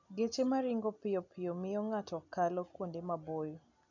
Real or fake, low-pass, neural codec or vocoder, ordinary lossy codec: real; 7.2 kHz; none; none